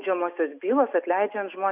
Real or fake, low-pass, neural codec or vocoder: real; 3.6 kHz; none